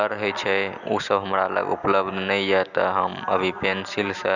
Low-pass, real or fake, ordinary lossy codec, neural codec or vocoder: 7.2 kHz; real; none; none